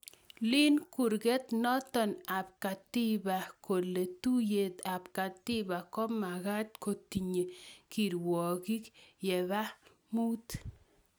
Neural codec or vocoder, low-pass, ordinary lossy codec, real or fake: none; none; none; real